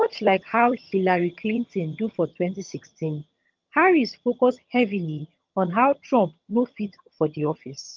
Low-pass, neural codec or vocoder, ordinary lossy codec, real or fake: 7.2 kHz; vocoder, 22.05 kHz, 80 mel bands, HiFi-GAN; Opus, 24 kbps; fake